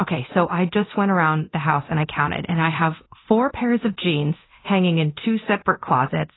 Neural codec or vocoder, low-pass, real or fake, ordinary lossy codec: codec, 24 kHz, 0.9 kbps, DualCodec; 7.2 kHz; fake; AAC, 16 kbps